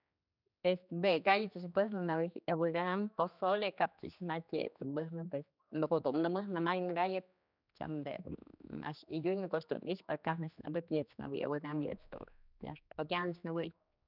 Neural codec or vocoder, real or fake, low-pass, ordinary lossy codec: codec, 16 kHz, 2 kbps, X-Codec, HuBERT features, trained on general audio; fake; 5.4 kHz; none